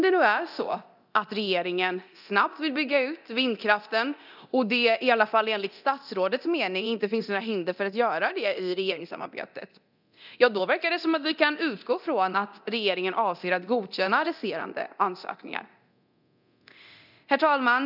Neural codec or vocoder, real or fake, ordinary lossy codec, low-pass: codec, 24 kHz, 0.9 kbps, DualCodec; fake; none; 5.4 kHz